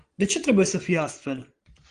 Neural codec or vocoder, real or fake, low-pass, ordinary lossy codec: vocoder, 22.05 kHz, 80 mel bands, Vocos; fake; 9.9 kHz; Opus, 24 kbps